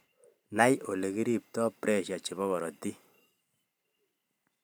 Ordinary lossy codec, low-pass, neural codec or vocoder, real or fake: none; none; vocoder, 44.1 kHz, 128 mel bands every 512 samples, BigVGAN v2; fake